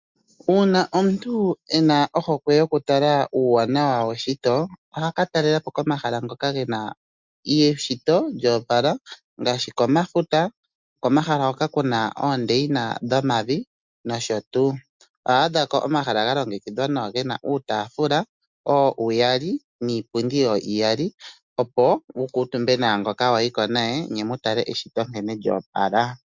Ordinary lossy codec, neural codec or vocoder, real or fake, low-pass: MP3, 64 kbps; none; real; 7.2 kHz